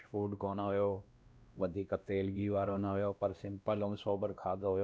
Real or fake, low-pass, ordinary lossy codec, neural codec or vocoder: fake; none; none; codec, 16 kHz, 1 kbps, X-Codec, WavLM features, trained on Multilingual LibriSpeech